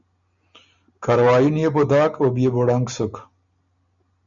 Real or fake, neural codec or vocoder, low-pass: real; none; 7.2 kHz